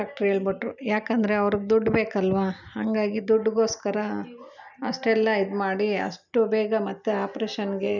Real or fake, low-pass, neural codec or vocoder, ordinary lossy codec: real; 7.2 kHz; none; none